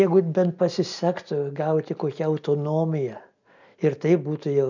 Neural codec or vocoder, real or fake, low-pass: none; real; 7.2 kHz